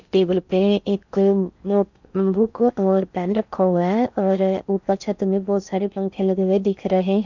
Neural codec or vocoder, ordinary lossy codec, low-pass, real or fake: codec, 16 kHz in and 24 kHz out, 0.6 kbps, FocalCodec, streaming, 4096 codes; none; 7.2 kHz; fake